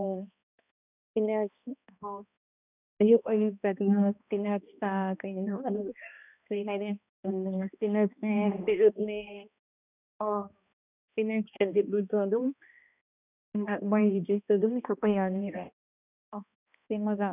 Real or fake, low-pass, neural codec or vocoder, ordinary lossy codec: fake; 3.6 kHz; codec, 16 kHz, 1 kbps, X-Codec, HuBERT features, trained on balanced general audio; none